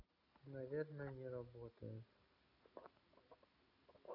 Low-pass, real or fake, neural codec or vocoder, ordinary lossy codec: 5.4 kHz; real; none; none